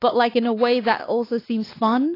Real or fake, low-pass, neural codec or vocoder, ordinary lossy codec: fake; 5.4 kHz; codec, 16 kHz, 2 kbps, X-Codec, WavLM features, trained on Multilingual LibriSpeech; AAC, 24 kbps